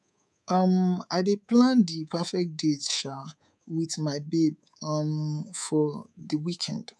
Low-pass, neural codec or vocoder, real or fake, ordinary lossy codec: none; codec, 24 kHz, 3.1 kbps, DualCodec; fake; none